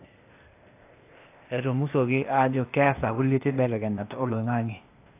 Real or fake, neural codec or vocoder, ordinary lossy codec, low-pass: fake; codec, 16 kHz, 0.8 kbps, ZipCodec; AAC, 24 kbps; 3.6 kHz